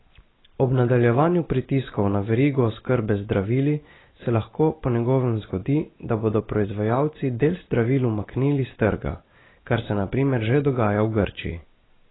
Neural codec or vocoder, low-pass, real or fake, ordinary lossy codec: none; 7.2 kHz; real; AAC, 16 kbps